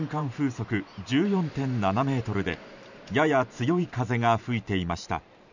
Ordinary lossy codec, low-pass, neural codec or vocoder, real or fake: none; 7.2 kHz; vocoder, 44.1 kHz, 128 mel bands every 512 samples, BigVGAN v2; fake